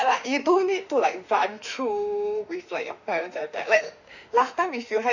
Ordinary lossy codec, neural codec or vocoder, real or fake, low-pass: none; autoencoder, 48 kHz, 32 numbers a frame, DAC-VAE, trained on Japanese speech; fake; 7.2 kHz